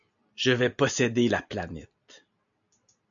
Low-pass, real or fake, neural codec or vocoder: 7.2 kHz; real; none